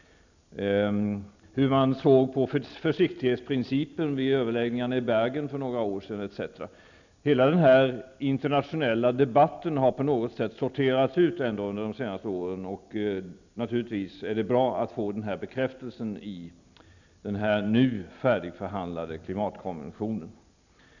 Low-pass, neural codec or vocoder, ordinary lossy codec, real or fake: 7.2 kHz; none; none; real